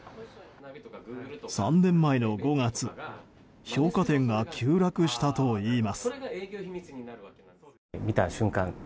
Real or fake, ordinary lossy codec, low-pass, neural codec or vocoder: real; none; none; none